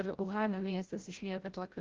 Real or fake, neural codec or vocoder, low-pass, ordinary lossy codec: fake; codec, 16 kHz, 0.5 kbps, FreqCodec, larger model; 7.2 kHz; Opus, 16 kbps